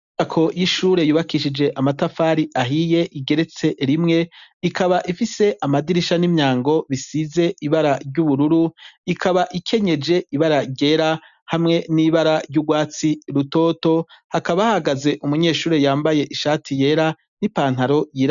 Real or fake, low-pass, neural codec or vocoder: real; 7.2 kHz; none